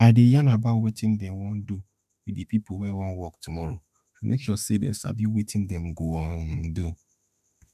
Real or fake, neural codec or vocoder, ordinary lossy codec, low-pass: fake; autoencoder, 48 kHz, 32 numbers a frame, DAC-VAE, trained on Japanese speech; none; 14.4 kHz